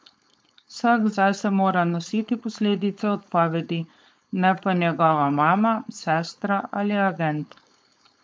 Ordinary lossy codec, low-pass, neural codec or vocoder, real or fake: none; none; codec, 16 kHz, 4.8 kbps, FACodec; fake